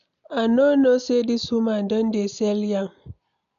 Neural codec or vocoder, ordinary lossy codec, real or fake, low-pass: none; none; real; 7.2 kHz